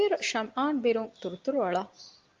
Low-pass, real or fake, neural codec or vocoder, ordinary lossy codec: 7.2 kHz; real; none; Opus, 32 kbps